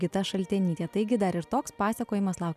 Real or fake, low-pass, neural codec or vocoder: real; 14.4 kHz; none